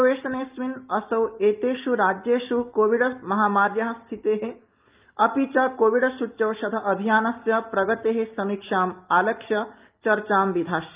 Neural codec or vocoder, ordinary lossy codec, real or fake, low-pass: none; Opus, 24 kbps; real; 3.6 kHz